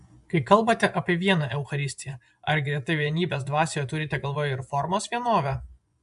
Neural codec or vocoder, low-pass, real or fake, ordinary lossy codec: vocoder, 24 kHz, 100 mel bands, Vocos; 10.8 kHz; fake; AAC, 96 kbps